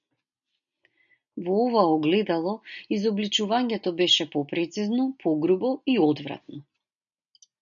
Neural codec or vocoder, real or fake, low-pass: none; real; 7.2 kHz